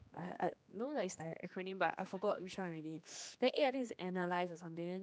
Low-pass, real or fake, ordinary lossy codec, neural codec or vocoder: none; fake; none; codec, 16 kHz, 2 kbps, X-Codec, HuBERT features, trained on general audio